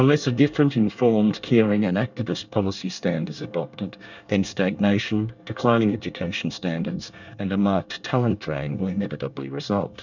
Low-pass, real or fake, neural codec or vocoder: 7.2 kHz; fake; codec, 24 kHz, 1 kbps, SNAC